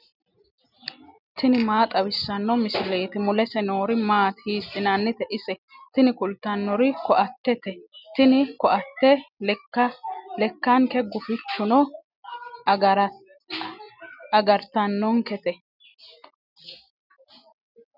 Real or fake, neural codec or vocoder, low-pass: real; none; 5.4 kHz